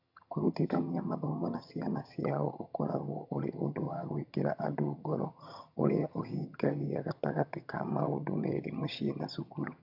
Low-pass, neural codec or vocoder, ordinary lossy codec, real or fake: 5.4 kHz; vocoder, 22.05 kHz, 80 mel bands, HiFi-GAN; none; fake